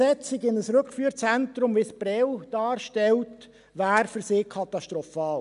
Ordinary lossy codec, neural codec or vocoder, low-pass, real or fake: none; none; 10.8 kHz; real